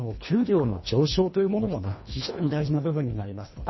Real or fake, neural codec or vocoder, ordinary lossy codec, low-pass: fake; codec, 24 kHz, 1.5 kbps, HILCodec; MP3, 24 kbps; 7.2 kHz